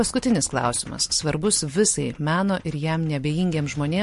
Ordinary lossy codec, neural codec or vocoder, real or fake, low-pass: MP3, 48 kbps; none; real; 14.4 kHz